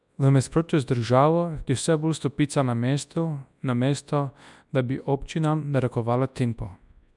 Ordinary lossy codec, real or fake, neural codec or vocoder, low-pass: none; fake; codec, 24 kHz, 0.9 kbps, WavTokenizer, large speech release; 10.8 kHz